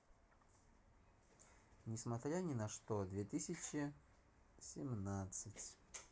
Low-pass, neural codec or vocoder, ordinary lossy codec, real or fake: none; none; none; real